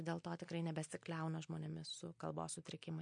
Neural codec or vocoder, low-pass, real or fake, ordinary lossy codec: none; 9.9 kHz; real; MP3, 48 kbps